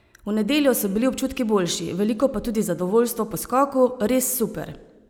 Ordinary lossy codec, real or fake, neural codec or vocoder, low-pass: none; real; none; none